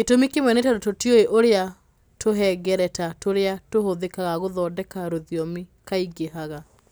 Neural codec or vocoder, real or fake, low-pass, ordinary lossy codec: none; real; none; none